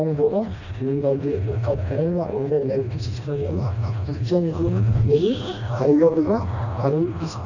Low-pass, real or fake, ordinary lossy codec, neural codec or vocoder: 7.2 kHz; fake; none; codec, 16 kHz, 1 kbps, FreqCodec, smaller model